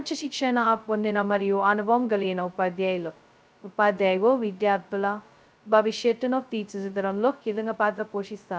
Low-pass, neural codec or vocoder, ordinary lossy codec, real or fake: none; codec, 16 kHz, 0.2 kbps, FocalCodec; none; fake